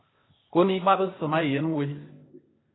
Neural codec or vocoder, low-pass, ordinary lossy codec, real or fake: codec, 16 kHz, 0.8 kbps, ZipCodec; 7.2 kHz; AAC, 16 kbps; fake